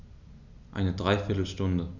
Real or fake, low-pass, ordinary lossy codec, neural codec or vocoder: real; 7.2 kHz; none; none